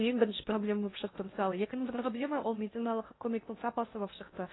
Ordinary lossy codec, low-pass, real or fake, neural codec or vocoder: AAC, 16 kbps; 7.2 kHz; fake; codec, 16 kHz in and 24 kHz out, 0.6 kbps, FocalCodec, streaming, 2048 codes